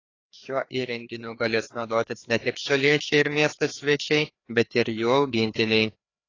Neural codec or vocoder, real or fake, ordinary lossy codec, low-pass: codec, 16 kHz, 2 kbps, FreqCodec, larger model; fake; AAC, 32 kbps; 7.2 kHz